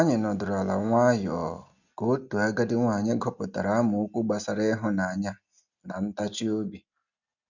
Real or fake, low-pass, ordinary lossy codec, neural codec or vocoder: real; 7.2 kHz; none; none